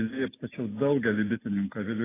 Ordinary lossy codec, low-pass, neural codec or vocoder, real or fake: AAC, 16 kbps; 3.6 kHz; none; real